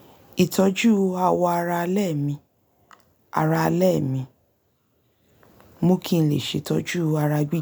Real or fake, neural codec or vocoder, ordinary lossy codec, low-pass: real; none; none; none